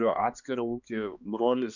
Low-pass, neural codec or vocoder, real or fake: 7.2 kHz; codec, 16 kHz, 2 kbps, X-Codec, HuBERT features, trained on balanced general audio; fake